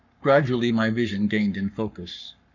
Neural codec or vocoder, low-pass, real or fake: codec, 44.1 kHz, 7.8 kbps, Pupu-Codec; 7.2 kHz; fake